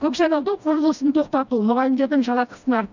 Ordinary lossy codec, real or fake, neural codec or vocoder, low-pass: none; fake; codec, 16 kHz, 1 kbps, FreqCodec, smaller model; 7.2 kHz